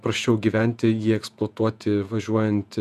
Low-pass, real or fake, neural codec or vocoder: 14.4 kHz; fake; vocoder, 48 kHz, 128 mel bands, Vocos